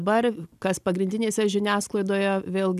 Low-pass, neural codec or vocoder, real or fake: 14.4 kHz; none; real